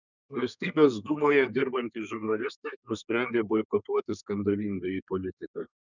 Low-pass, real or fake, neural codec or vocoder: 7.2 kHz; fake; codec, 32 kHz, 1.9 kbps, SNAC